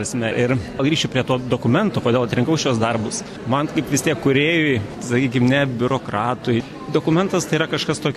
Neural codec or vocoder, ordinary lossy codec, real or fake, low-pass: none; AAC, 64 kbps; real; 14.4 kHz